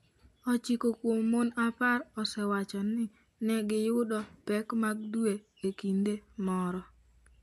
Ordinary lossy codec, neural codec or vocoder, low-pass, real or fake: none; none; 14.4 kHz; real